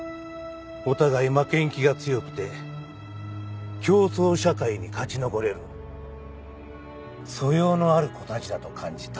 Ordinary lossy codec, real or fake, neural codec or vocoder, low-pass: none; real; none; none